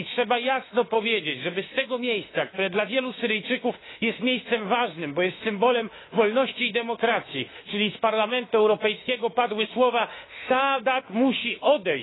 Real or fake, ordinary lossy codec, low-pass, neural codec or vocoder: fake; AAC, 16 kbps; 7.2 kHz; autoencoder, 48 kHz, 32 numbers a frame, DAC-VAE, trained on Japanese speech